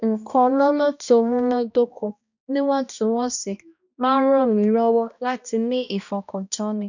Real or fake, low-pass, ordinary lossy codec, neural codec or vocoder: fake; 7.2 kHz; none; codec, 16 kHz, 1 kbps, X-Codec, HuBERT features, trained on balanced general audio